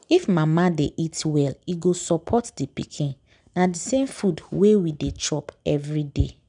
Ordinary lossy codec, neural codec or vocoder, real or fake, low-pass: none; none; real; 9.9 kHz